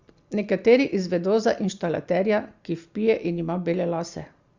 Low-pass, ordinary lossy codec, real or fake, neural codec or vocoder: 7.2 kHz; Opus, 64 kbps; real; none